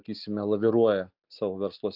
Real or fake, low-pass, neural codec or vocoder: real; 5.4 kHz; none